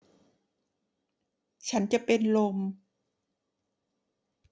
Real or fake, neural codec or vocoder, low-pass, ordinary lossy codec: real; none; none; none